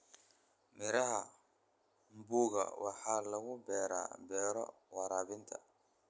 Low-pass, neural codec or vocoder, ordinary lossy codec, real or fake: none; none; none; real